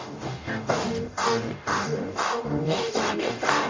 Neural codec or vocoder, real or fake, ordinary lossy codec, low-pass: codec, 44.1 kHz, 0.9 kbps, DAC; fake; MP3, 64 kbps; 7.2 kHz